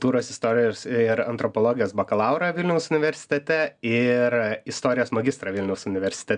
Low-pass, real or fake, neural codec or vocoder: 9.9 kHz; real; none